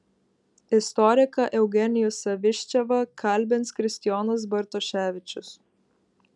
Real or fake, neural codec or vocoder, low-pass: real; none; 10.8 kHz